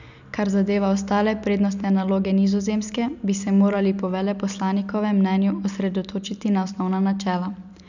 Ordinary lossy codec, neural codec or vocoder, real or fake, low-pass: none; none; real; 7.2 kHz